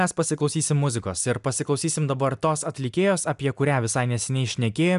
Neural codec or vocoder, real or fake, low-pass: none; real; 10.8 kHz